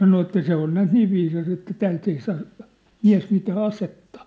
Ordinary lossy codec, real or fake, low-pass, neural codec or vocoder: none; real; none; none